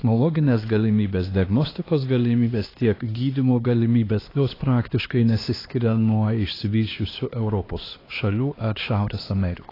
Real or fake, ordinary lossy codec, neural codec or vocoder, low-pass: fake; AAC, 24 kbps; codec, 16 kHz, 2 kbps, X-Codec, HuBERT features, trained on LibriSpeech; 5.4 kHz